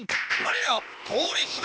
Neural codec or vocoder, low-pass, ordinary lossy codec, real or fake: codec, 16 kHz, 0.8 kbps, ZipCodec; none; none; fake